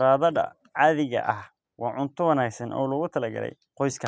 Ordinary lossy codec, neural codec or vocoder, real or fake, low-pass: none; none; real; none